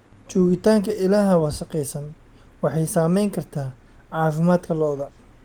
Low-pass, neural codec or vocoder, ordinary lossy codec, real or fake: 19.8 kHz; none; Opus, 24 kbps; real